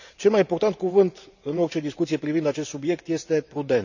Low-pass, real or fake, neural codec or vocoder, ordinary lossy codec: 7.2 kHz; fake; vocoder, 44.1 kHz, 128 mel bands every 512 samples, BigVGAN v2; none